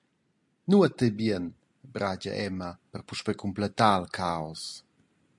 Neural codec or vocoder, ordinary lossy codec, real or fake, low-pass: none; MP3, 64 kbps; real; 10.8 kHz